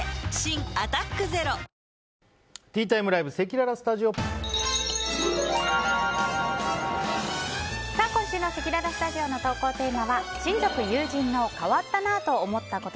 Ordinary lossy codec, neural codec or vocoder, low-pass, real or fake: none; none; none; real